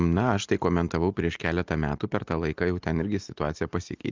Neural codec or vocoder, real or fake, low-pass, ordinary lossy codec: none; real; 7.2 kHz; Opus, 32 kbps